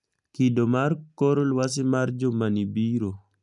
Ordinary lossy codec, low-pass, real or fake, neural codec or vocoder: none; 10.8 kHz; real; none